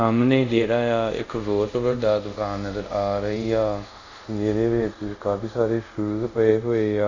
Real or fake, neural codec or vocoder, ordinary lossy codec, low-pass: fake; codec, 24 kHz, 0.5 kbps, DualCodec; none; 7.2 kHz